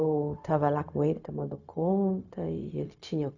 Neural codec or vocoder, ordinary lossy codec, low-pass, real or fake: codec, 16 kHz, 0.4 kbps, LongCat-Audio-Codec; none; 7.2 kHz; fake